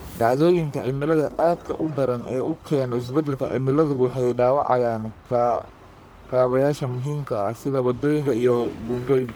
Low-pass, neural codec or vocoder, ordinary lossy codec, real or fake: none; codec, 44.1 kHz, 1.7 kbps, Pupu-Codec; none; fake